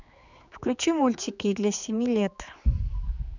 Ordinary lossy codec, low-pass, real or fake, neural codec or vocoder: none; 7.2 kHz; fake; codec, 16 kHz, 4 kbps, X-Codec, HuBERT features, trained on general audio